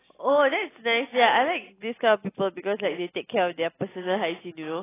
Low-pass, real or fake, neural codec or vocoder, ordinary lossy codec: 3.6 kHz; real; none; AAC, 16 kbps